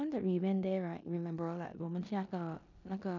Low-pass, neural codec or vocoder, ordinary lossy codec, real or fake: 7.2 kHz; codec, 16 kHz in and 24 kHz out, 0.9 kbps, LongCat-Audio-Codec, four codebook decoder; none; fake